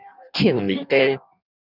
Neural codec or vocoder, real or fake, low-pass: codec, 16 kHz in and 24 kHz out, 1.1 kbps, FireRedTTS-2 codec; fake; 5.4 kHz